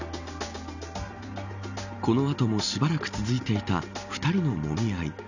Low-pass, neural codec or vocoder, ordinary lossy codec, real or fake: 7.2 kHz; none; none; real